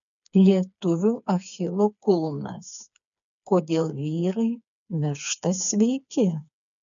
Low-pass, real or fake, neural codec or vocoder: 7.2 kHz; fake; codec, 16 kHz, 4 kbps, FreqCodec, smaller model